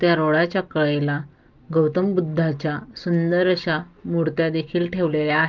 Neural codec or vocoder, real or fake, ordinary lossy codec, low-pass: none; real; Opus, 32 kbps; 7.2 kHz